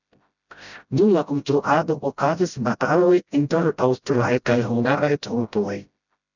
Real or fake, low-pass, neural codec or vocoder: fake; 7.2 kHz; codec, 16 kHz, 0.5 kbps, FreqCodec, smaller model